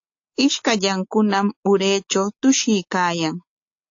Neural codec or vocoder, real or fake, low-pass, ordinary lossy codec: none; real; 7.2 kHz; AAC, 48 kbps